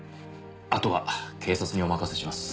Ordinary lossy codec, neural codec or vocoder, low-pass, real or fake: none; none; none; real